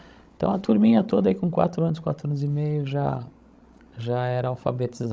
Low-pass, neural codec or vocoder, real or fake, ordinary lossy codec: none; codec, 16 kHz, 16 kbps, FreqCodec, larger model; fake; none